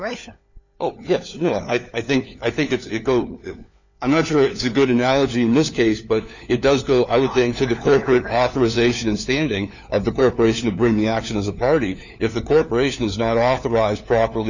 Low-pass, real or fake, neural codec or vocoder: 7.2 kHz; fake; codec, 16 kHz, 4 kbps, FunCodec, trained on LibriTTS, 50 frames a second